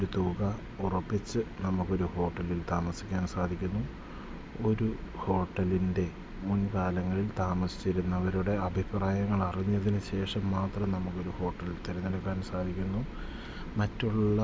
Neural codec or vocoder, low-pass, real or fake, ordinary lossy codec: none; none; real; none